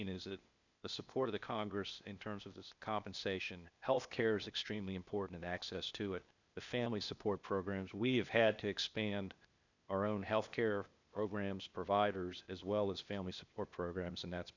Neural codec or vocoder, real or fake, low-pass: codec, 16 kHz, 0.8 kbps, ZipCodec; fake; 7.2 kHz